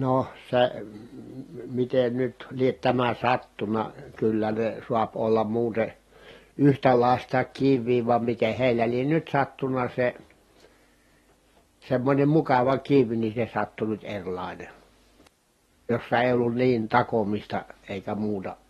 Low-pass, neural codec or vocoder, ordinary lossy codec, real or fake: 19.8 kHz; none; AAC, 32 kbps; real